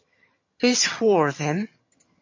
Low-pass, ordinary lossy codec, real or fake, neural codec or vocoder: 7.2 kHz; MP3, 32 kbps; fake; vocoder, 22.05 kHz, 80 mel bands, HiFi-GAN